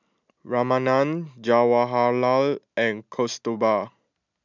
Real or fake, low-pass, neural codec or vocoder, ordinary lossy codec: real; 7.2 kHz; none; none